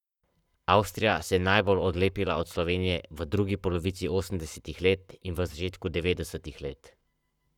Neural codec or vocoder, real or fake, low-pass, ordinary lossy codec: codec, 44.1 kHz, 7.8 kbps, Pupu-Codec; fake; 19.8 kHz; none